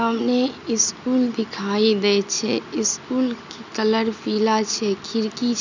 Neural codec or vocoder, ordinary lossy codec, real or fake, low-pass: none; none; real; 7.2 kHz